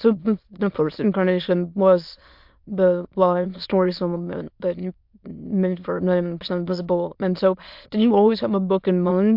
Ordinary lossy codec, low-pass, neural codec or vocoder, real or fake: MP3, 48 kbps; 5.4 kHz; autoencoder, 22.05 kHz, a latent of 192 numbers a frame, VITS, trained on many speakers; fake